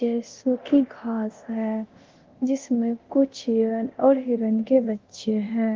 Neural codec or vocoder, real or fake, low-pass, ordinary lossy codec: codec, 24 kHz, 0.9 kbps, DualCodec; fake; 7.2 kHz; Opus, 16 kbps